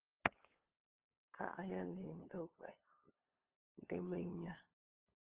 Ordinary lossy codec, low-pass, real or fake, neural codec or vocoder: Opus, 16 kbps; 3.6 kHz; fake; codec, 16 kHz, 8 kbps, FunCodec, trained on LibriTTS, 25 frames a second